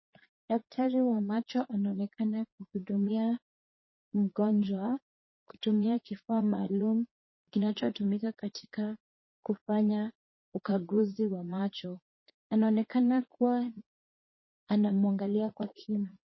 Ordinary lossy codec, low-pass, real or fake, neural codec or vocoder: MP3, 24 kbps; 7.2 kHz; fake; vocoder, 22.05 kHz, 80 mel bands, Vocos